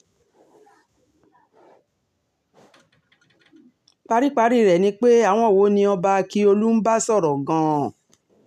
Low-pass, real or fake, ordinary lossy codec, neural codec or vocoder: 14.4 kHz; real; none; none